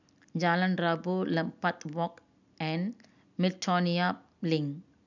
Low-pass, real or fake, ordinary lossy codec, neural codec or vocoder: 7.2 kHz; real; none; none